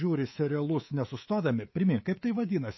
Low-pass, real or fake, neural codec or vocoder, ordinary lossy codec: 7.2 kHz; fake; codec, 24 kHz, 3.1 kbps, DualCodec; MP3, 24 kbps